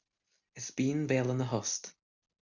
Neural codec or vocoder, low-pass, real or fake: none; 7.2 kHz; real